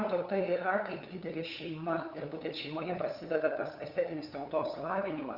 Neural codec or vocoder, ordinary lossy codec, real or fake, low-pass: codec, 16 kHz, 8 kbps, FunCodec, trained on LibriTTS, 25 frames a second; Opus, 64 kbps; fake; 5.4 kHz